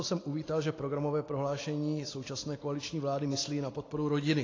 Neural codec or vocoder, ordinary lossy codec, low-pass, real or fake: none; AAC, 32 kbps; 7.2 kHz; real